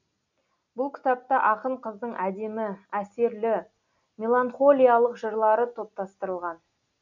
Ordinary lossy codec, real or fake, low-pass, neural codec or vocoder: none; real; 7.2 kHz; none